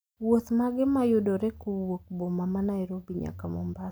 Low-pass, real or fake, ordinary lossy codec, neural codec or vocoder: none; real; none; none